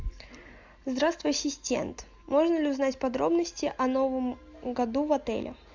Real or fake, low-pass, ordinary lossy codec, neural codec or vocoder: real; 7.2 kHz; MP3, 64 kbps; none